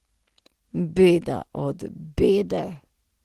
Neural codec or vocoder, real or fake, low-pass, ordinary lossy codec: none; real; 14.4 kHz; Opus, 16 kbps